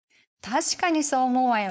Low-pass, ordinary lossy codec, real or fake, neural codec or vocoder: none; none; fake; codec, 16 kHz, 4.8 kbps, FACodec